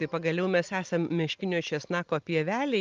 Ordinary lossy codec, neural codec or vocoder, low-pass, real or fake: Opus, 24 kbps; none; 7.2 kHz; real